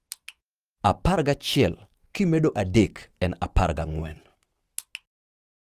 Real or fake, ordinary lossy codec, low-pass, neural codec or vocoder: fake; Opus, 24 kbps; 14.4 kHz; autoencoder, 48 kHz, 128 numbers a frame, DAC-VAE, trained on Japanese speech